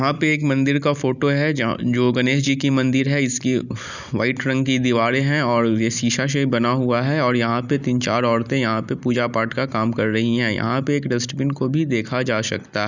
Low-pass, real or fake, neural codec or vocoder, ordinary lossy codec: 7.2 kHz; real; none; none